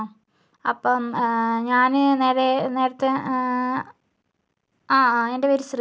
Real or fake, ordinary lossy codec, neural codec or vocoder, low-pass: real; none; none; none